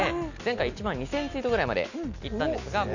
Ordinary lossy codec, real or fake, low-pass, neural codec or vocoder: none; real; 7.2 kHz; none